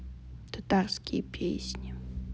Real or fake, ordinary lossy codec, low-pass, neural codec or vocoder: real; none; none; none